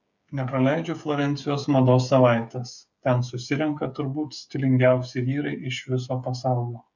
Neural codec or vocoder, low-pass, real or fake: codec, 16 kHz, 8 kbps, FreqCodec, smaller model; 7.2 kHz; fake